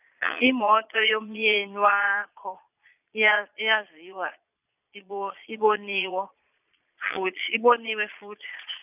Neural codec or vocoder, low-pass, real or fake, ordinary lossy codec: vocoder, 22.05 kHz, 80 mel bands, Vocos; 3.6 kHz; fake; none